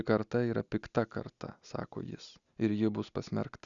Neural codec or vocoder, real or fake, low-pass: none; real; 7.2 kHz